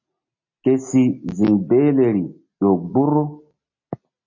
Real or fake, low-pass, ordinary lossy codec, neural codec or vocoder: real; 7.2 kHz; MP3, 32 kbps; none